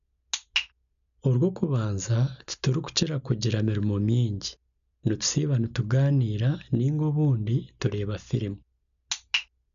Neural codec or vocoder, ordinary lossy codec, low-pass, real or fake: none; none; 7.2 kHz; real